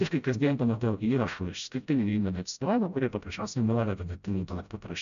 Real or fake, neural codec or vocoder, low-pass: fake; codec, 16 kHz, 0.5 kbps, FreqCodec, smaller model; 7.2 kHz